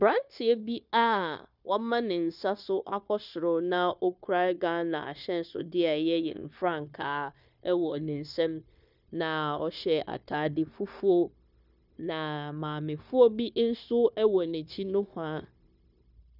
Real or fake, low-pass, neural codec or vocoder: fake; 5.4 kHz; codec, 16 kHz, 0.9 kbps, LongCat-Audio-Codec